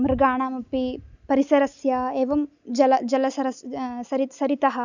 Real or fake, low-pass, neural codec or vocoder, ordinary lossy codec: real; 7.2 kHz; none; none